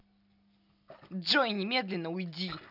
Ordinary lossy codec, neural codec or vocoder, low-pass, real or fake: none; none; 5.4 kHz; real